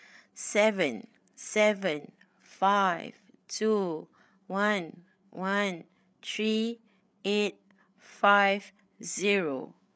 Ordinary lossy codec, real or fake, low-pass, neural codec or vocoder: none; fake; none; codec, 16 kHz, 8 kbps, FreqCodec, larger model